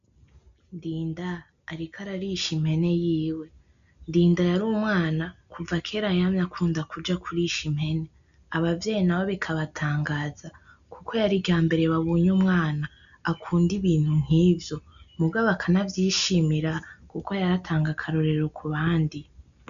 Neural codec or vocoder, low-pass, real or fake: none; 7.2 kHz; real